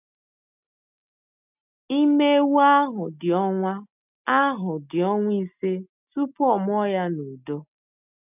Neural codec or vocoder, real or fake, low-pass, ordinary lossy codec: none; real; 3.6 kHz; none